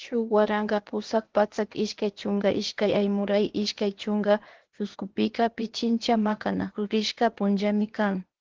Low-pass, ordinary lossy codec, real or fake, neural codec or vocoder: 7.2 kHz; Opus, 16 kbps; fake; codec, 16 kHz, 0.8 kbps, ZipCodec